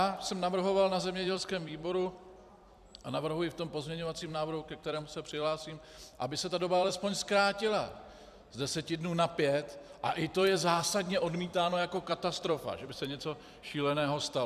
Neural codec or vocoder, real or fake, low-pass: vocoder, 44.1 kHz, 128 mel bands every 256 samples, BigVGAN v2; fake; 14.4 kHz